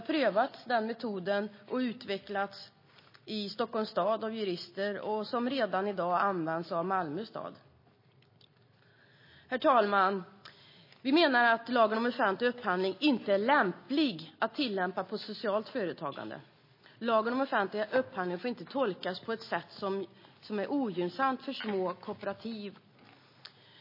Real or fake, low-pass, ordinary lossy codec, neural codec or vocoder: real; 5.4 kHz; MP3, 24 kbps; none